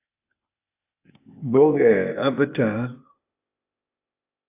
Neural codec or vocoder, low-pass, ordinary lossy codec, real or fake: codec, 16 kHz, 0.8 kbps, ZipCodec; 3.6 kHz; AAC, 24 kbps; fake